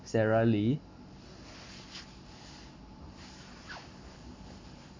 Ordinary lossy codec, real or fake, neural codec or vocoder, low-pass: MP3, 48 kbps; real; none; 7.2 kHz